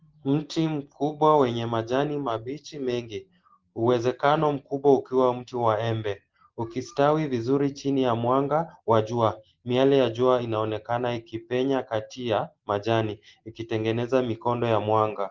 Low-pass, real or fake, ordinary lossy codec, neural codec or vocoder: 7.2 kHz; real; Opus, 16 kbps; none